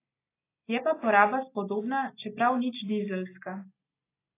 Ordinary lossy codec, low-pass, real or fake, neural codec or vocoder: AAC, 24 kbps; 3.6 kHz; real; none